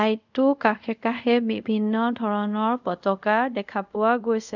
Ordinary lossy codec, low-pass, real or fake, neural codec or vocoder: none; 7.2 kHz; fake; codec, 24 kHz, 0.5 kbps, DualCodec